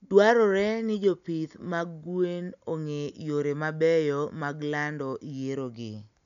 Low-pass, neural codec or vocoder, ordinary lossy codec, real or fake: 7.2 kHz; none; none; real